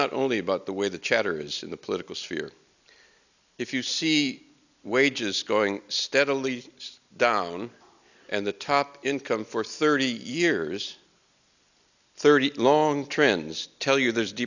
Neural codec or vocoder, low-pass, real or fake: none; 7.2 kHz; real